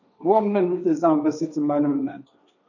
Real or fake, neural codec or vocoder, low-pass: fake; codec, 16 kHz, 1.1 kbps, Voila-Tokenizer; 7.2 kHz